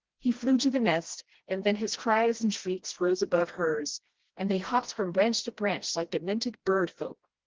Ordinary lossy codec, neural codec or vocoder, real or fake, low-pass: Opus, 16 kbps; codec, 16 kHz, 1 kbps, FreqCodec, smaller model; fake; 7.2 kHz